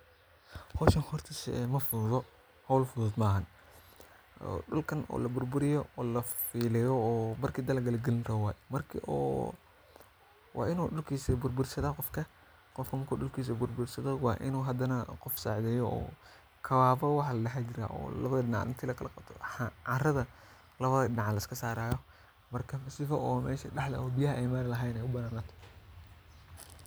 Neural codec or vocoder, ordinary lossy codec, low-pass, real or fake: none; none; none; real